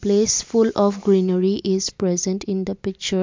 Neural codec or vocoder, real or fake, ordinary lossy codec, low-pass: none; real; MP3, 64 kbps; 7.2 kHz